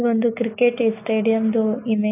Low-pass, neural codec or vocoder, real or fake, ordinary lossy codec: 3.6 kHz; none; real; none